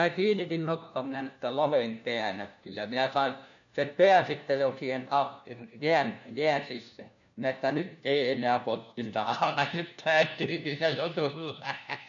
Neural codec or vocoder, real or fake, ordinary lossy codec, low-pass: codec, 16 kHz, 1 kbps, FunCodec, trained on LibriTTS, 50 frames a second; fake; none; 7.2 kHz